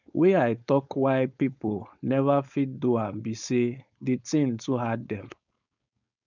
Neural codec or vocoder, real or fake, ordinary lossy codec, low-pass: codec, 16 kHz, 4.8 kbps, FACodec; fake; none; 7.2 kHz